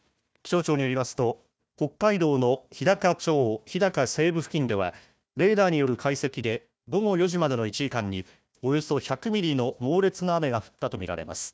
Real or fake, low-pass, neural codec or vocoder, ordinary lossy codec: fake; none; codec, 16 kHz, 1 kbps, FunCodec, trained on Chinese and English, 50 frames a second; none